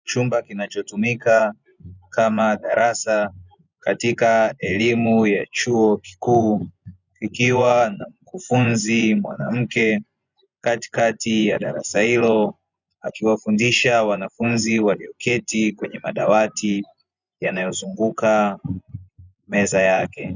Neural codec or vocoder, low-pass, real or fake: vocoder, 24 kHz, 100 mel bands, Vocos; 7.2 kHz; fake